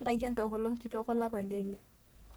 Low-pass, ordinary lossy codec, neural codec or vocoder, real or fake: none; none; codec, 44.1 kHz, 1.7 kbps, Pupu-Codec; fake